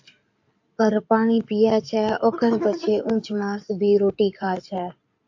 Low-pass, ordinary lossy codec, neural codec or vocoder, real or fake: 7.2 kHz; AAC, 48 kbps; codec, 16 kHz in and 24 kHz out, 2.2 kbps, FireRedTTS-2 codec; fake